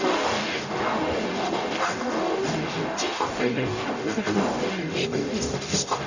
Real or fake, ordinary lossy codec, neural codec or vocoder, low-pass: fake; none; codec, 44.1 kHz, 0.9 kbps, DAC; 7.2 kHz